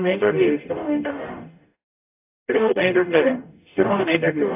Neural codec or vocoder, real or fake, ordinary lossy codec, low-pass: codec, 44.1 kHz, 0.9 kbps, DAC; fake; AAC, 32 kbps; 3.6 kHz